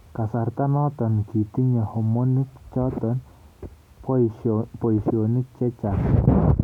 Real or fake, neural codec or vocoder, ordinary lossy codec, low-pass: real; none; none; 19.8 kHz